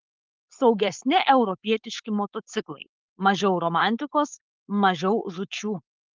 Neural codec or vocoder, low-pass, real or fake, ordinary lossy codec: codec, 16 kHz, 4.8 kbps, FACodec; 7.2 kHz; fake; Opus, 32 kbps